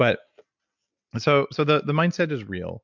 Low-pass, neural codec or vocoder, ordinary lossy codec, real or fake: 7.2 kHz; none; MP3, 64 kbps; real